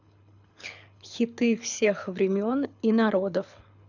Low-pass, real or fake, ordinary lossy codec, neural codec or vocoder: 7.2 kHz; fake; none; codec, 24 kHz, 6 kbps, HILCodec